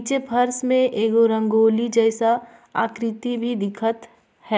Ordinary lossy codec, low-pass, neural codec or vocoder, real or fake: none; none; none; real